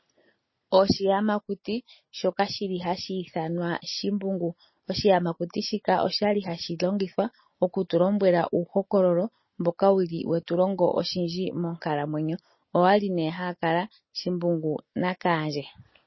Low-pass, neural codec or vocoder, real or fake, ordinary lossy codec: 7.2 kHz; none; real; MP3, 24 kbps